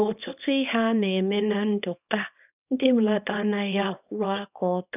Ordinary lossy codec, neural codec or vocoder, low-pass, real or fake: none; codec, 24 kHz, 0.9 kbps, WavTokenizer, small release; 3.6 kHz; fake